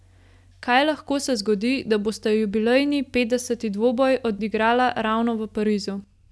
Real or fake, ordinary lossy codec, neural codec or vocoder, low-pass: real; none; none; none